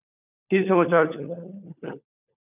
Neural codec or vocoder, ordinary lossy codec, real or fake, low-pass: codec, 16 kHz, 4 kbps, FunCodec, trained on LibriTTS, 50 frames a second; none; fake; 3.6 kHz